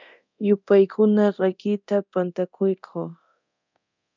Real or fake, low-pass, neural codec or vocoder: fake; 7.2 kHz; codec, 24 kHz, 0.9 kbps, DualCodec